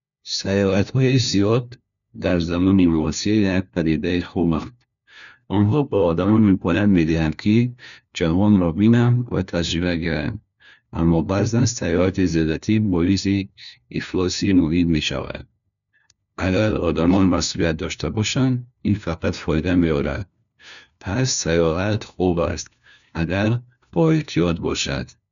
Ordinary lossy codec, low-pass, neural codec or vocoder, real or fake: none; 7.2 kHz; codec, 16 kHz, 1 kbps, FunCodec, trained on LibriTTS, 50 frames a second; fake